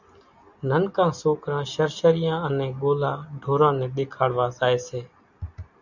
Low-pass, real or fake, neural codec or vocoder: 7.2 kHz; real; none